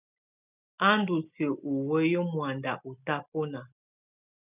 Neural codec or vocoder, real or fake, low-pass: none; real; 3.6 kHz